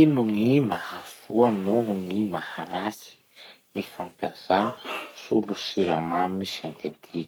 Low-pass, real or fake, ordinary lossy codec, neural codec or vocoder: none; fake; none; codec, 44.1 kHz, 3.4 kbps, Pupu-Codec